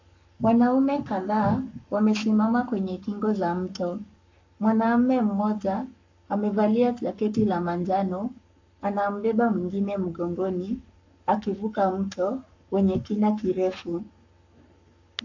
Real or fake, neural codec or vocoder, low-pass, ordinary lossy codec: fake; codec, 44.1 kHz, 7.8 kbps, Pupu-Codec; 7.2 kHz; AAC, 48 kbps